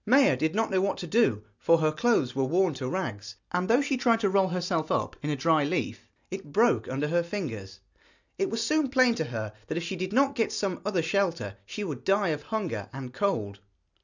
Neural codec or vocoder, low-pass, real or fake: none; 7.2 kHz; real